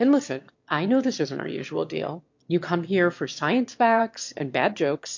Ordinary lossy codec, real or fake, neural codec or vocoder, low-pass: MP3, 48 kbps; fake; autoencoder, 22.05 kHz, a latent of 192 numbers a frame, VITS, trained on one speaker; 7.2 kHz